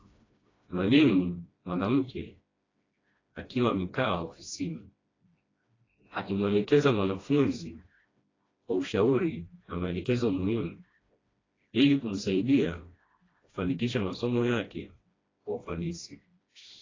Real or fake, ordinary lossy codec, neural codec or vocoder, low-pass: fake; AAC, 32 kbps; codec, 16 kHz, 1 kbps, FreqCodec, smaller model; 7.2 kHz